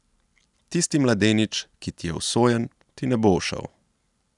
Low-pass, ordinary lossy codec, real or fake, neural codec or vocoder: 10.8 kHz; none; real; none